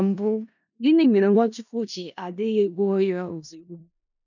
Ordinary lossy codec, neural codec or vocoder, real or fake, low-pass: none; codec, 16 kHz in and 24 kHz out, 0.4 kbps, LongCat-Audio-Codec, four codebook decoder; fake; 7.2 kHz